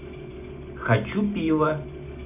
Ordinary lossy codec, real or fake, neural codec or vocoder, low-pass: none; real; none; 3.6 kHz